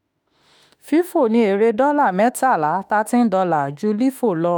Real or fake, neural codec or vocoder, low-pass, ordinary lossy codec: fake; autoencoder, 48 kHz, 32 numbers a frame, DAC-VAE, trained on Japanese speech; none; none